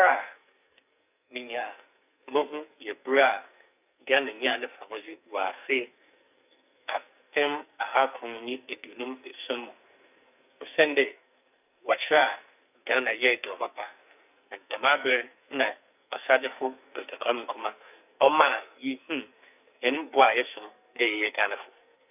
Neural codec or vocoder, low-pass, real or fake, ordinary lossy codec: codec, 44.1 kHz, 2.6 kbps, SNAC; 3.6 kHz; fake; none